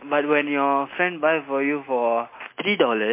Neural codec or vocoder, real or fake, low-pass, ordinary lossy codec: none; real; 3.6 kHz; MP3, 24 kbps